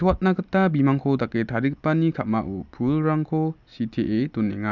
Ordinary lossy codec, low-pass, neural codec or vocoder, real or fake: none; 7.2 kHz; none; real